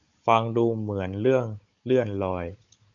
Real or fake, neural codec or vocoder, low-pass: fake; codec, 16 kHz, 16 kbps, FunCodec, trained on Chinese and English, 50 frames a second; 7.2 kHz